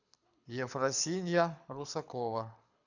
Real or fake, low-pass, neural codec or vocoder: fake; 7.2 kHz; codec, 24 kHz, 6 kbps, HILCodec